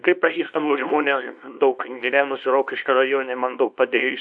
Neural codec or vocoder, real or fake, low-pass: codec, 24 kHz, 0.9 kbps, WavTokenizer, small release; fake; 9.9 kHz